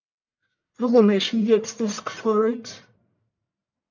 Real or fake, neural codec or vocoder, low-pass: fake; codec, 44.1 kHz, 1.7 kbps, Pupu-Codec; 7.2 kHz